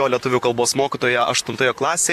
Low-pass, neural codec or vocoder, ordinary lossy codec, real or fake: 14.4 kHz; vocoder, 44.1 kHz, 128 mel bands, Pupu-Vocoder; AAC, 96 kbps; fake